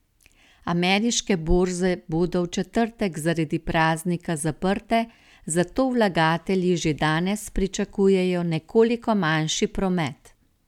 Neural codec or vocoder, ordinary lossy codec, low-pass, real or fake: none; none; 19.8 kHz; real